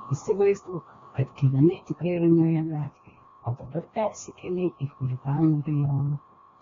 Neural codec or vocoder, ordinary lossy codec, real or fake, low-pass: codec, 16 kHz, 1 kbps, FreqCodec, larger model; AAC, 32 kbps; fake; 7.2 kHz